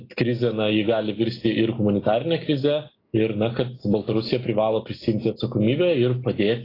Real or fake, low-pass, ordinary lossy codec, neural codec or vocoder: real; 5.4 kHz; AAC, 24 kbps; none